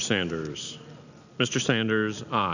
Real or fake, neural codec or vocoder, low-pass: real; none; 7.2 kHz